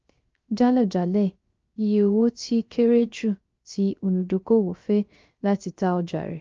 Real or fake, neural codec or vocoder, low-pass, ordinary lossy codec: fake; codec, 16 kHz, 0.3 kbps, FocalCodec; 7.2 kHz; Opus, 24 kbps